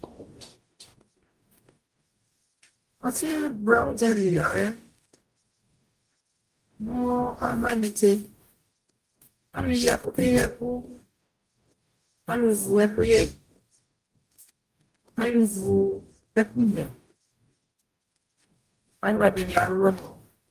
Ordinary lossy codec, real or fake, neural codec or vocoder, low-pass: Opus, 32 kbps; fake; codec, 44.1 kHz, 0.9 kbps, DAC; 14.4 kHz